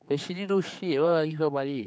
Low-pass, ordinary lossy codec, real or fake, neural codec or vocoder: none; none; fake; codec, 16 kHz, 4 kbps, X-Codec, HuBERT features, trained on general audio